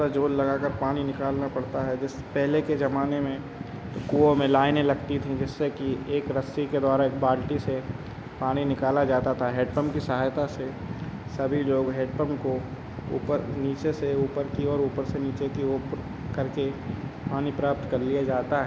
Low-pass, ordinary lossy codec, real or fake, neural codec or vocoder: none; none; real; none